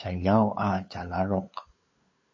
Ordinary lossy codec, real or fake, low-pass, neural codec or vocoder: MP3, 32 kbps; fake; 7.2 kHz; codec, 16 kHz, 8 kbps, FunCodec, trained on LibriTTS, 25 frames a second